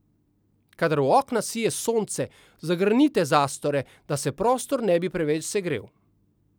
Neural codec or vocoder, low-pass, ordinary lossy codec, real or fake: none; none; none; real